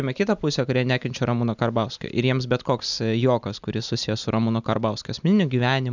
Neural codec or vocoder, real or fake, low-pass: none; real; 7.2 kHz